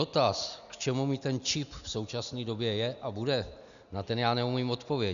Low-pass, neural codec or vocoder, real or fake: 7.2 kHz; none; real